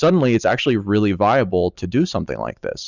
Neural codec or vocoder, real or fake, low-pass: none; real; 7.2 kHz